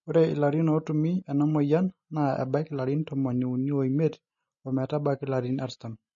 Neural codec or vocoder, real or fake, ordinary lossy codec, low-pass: none; real; MP3, 32 kbps; 7.2 kHz